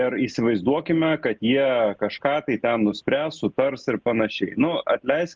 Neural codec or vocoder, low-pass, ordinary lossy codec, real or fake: none; 7.2 kHz; Opus, 24 kbps; real